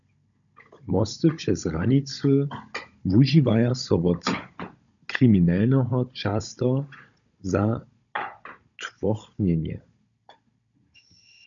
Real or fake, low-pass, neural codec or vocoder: fake; 7.2 kHz; codec, 16 kHz, 16 kbps, FunCodec, trained on Chinese and English, 50 frames a second